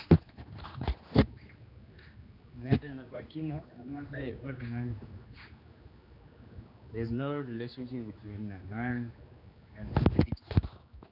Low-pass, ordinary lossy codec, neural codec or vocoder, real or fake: 5.4 kHz; none; codec, 16 kHz, 2 kbps, X-Codec, HuBERT features, trained on general audio; fake